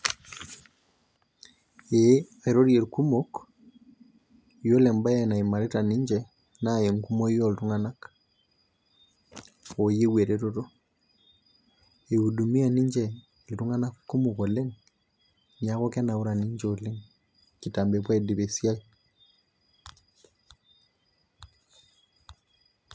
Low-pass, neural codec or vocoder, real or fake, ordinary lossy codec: none; none; real; none